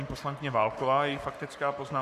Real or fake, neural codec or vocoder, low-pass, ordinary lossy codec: fake; codec, 44.1 kHz, 7.8 kbps, Pupu-Codec; 14.4 kHz; Opus, 64 kbps